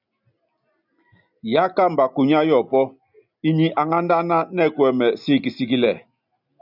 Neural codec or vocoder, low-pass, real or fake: none; 5.4 kHz; real